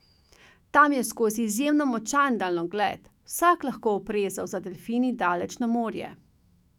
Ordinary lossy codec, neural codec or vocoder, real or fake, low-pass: none; autoencoder, 48 kHz, 128 numbers a frame, DAC-VAE, trained on Japanese speech; fake; 19.8 kHz